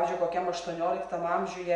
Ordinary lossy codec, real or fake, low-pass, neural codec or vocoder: Opus, 64 kbps; real; 9.9 kHz; none